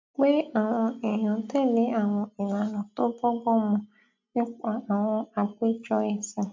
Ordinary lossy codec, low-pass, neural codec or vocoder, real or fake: MP3, 64 kbps; 7.2 kHz; none; real